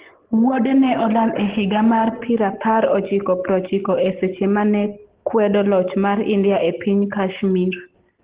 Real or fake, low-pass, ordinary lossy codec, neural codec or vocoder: real; 3.6 kHz; Opus, 16 kbps; none